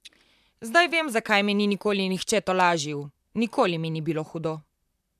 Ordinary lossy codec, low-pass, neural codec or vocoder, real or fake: none; 14.4 kHz; vocoder, 44.1 kHz, 128 mel bands, Pupu-Vocoder; fake